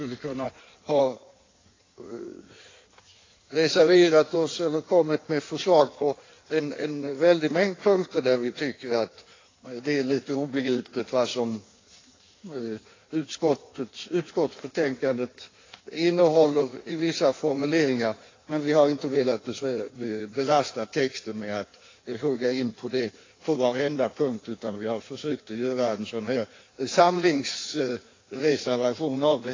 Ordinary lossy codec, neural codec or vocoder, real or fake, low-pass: AAC, 32 kbps; codec, 16 kHz in and 24 kHz out, 1.1 kbps, FireRedTTS-2 codec; fake; 7.2 kHz